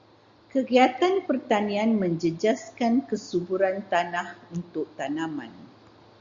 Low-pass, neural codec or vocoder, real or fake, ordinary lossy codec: 7.2 kHz; none; real; Opus, 64 kbps